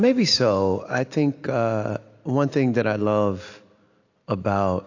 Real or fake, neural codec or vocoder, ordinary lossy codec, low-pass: real; none; AAC, 48 kbps; 7.2 kHz